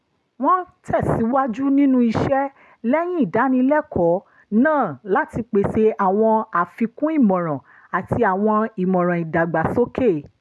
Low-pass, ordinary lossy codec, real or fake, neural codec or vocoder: none; none; real; none